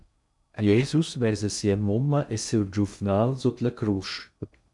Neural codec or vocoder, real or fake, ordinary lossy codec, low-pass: codec, 16 kHz in and 24 kHz out, 0.6 kbps, FocalCodec, streaming, 2048 codes; fake; MP3, 96 kbps; 10.8 kHz